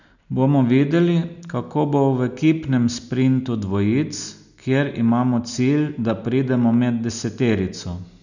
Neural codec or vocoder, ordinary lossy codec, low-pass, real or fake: none; none; 7.2 kHz; real